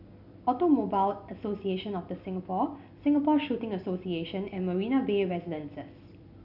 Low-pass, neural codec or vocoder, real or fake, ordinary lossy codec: 5.4 kHz; none; real; none